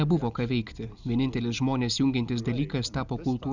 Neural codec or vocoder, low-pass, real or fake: none; 7.2 kHz; real